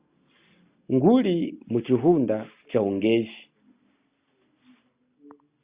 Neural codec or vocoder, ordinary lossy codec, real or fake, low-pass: none; Opus, 64 kbps; real; 3.6 kHz